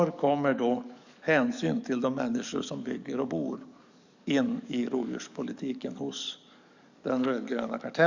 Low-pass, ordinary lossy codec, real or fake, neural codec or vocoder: 7.2 kHz; none; fake; codec, 44.1 kHz, 7.8 kbps, DAC